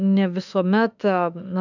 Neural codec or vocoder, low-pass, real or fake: autoencoder, 48 kHz, 32 numbers a frame, DAC-VAE, trained on Japanese speech; 7.2 kHz; fake